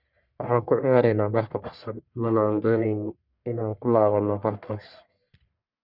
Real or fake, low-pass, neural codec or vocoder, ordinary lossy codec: fake; 5.4 kHz; codec, 44.1 kHz, 1.7 kbps, Pupu-Codec; none